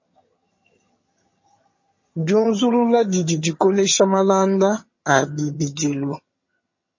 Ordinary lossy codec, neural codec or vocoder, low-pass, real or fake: MP3, 32 kbps; vocoder, 22.05 kHz, 80 mel bands, HiFi-GAN; 7.2 kHz; fake